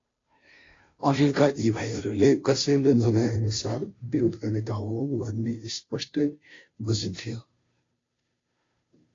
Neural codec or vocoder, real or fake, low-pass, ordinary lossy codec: codec, 16 kHz, 0.5 kbps, FunCodec, trained on Chinese and English, 25 frames a second; fake; 7.2 kHz; AAC, 32 kbps